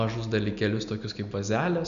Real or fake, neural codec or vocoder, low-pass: real; none; 7.2 kHz